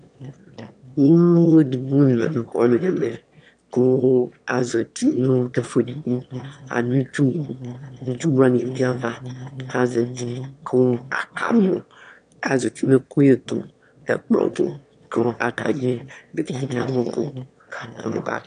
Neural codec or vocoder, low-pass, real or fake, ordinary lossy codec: autoencoder, 22.05 kHz, a latent of 192 numbers a frame, VITS, trained on one speaker; 9.9 kHz; fake; MP3, 96 kbps